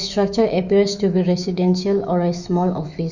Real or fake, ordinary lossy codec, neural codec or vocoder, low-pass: fake; none; autoencoder, 48 kHz, 128 numbers a frame, DAC-VAE, trained on Japanese speech; 7.2 kHz